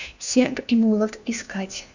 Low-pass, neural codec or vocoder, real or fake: 7.2 kHz; codec, 16 kHz, 1 kbps, FunCodec, trained on LibriTTS, 50 frames a second; fake